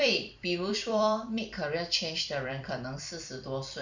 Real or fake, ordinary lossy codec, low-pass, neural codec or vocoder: fake; Opus, 64 kbps; 7.2 kHz; vocoder, 44.1 kHz, 128 mel bands every 256 samples, BigVGAN v2